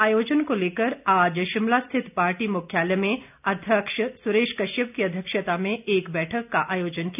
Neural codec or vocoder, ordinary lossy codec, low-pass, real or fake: none; none; 3.6 kHz; real